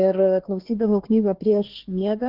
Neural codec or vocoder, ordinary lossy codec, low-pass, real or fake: codec, 16 kHz, 2 kbps, X-Codec, HuBERT features, trained on LibriSpeech; Opus, 16 kbps; 5.4 kHz; fake